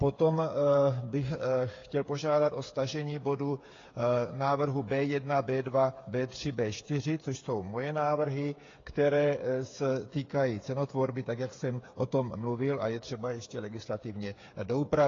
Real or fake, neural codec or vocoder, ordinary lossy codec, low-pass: fake; codec, 16 kHz, 8 kbps, FreqCodec, smaller model; AAC, 32 kbps; 7.2 kHz